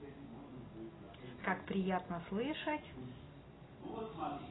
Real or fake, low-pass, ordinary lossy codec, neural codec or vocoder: real; 7.2 kHz; AAC, 16 kbps; none